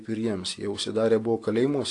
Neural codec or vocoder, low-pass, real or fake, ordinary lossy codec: none; 10.8 kHz; real; AAC, 48 kbps